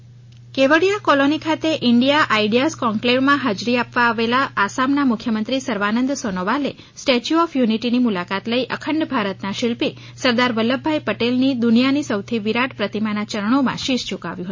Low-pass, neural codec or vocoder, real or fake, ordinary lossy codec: 7.2 kHz; none; real; MP3, 32 kbps